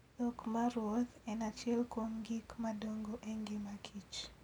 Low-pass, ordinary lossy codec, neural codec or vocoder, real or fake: 19.8 kHz; none; none; real